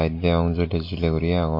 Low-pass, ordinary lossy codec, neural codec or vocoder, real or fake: 5.4 kHz; MP3, 32 kbps; none; real